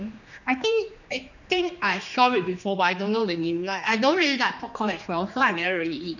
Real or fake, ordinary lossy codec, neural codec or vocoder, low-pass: fake; none; codec, 16 kHz, 2 kbps, X-Codec, HuBERT features, trained on general audio; 7.2 kHz